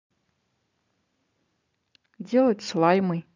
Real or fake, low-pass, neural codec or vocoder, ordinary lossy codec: real; 7.2 kHz; none; MP3, 64 kbps